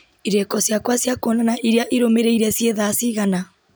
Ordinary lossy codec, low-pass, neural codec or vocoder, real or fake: none; none; none; real